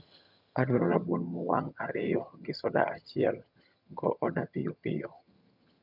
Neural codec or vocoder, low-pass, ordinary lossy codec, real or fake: vocoder, 22.05 kHz, 80 mel bands, HiFi-GAN; 5.4 kHz; none; fake